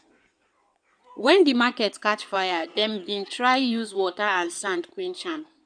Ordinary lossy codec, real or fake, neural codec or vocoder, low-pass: none; fake; codec, 16 kHz in and 24 kHz out, 2.2 kbps, FireRedTTS-2 codec; 9.9 kHz